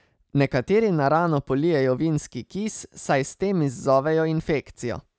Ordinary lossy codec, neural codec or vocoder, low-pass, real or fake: none; none; none; real